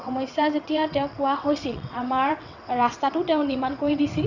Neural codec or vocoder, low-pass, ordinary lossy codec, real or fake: vocoder, 22.05 kHz, 80 mel bands, WaveNeXt; 7.2 kHz; none; fake